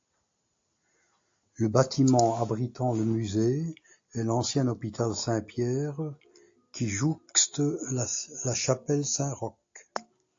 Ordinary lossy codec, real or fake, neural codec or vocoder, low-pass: AAC, 48 kbps; real; none; 7.2 kHz